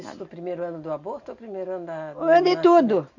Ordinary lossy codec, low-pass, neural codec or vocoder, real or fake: none; 7.2 kHz; none; real